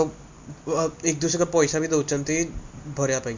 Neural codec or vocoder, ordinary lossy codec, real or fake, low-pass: none; MP3, 48 kbps; real; 7.2 kHz